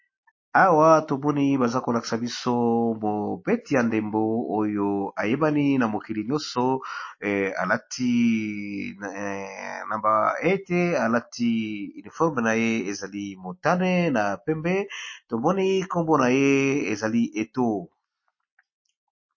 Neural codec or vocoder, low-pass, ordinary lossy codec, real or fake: none; 7.2 kHz; MP3, 32 kbps; real